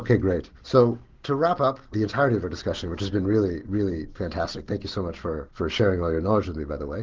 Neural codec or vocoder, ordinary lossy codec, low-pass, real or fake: none; Opus, 16 kbps; 7.2 kHz; real